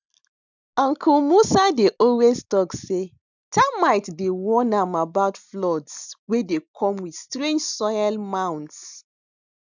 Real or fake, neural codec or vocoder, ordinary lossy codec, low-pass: real; none; none; 7.2 kHz